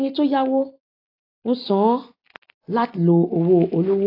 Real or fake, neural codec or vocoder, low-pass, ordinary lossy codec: real; none; 5.4 kHz; AAC, 32 kbps